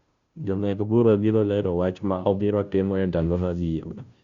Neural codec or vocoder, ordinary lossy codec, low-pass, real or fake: codec, 16 kHz, 0.5 kbps, FunCodec, trained on Chinese and English, 25 frames a second; Opus, 64 kbps; 7.2 kHz; fake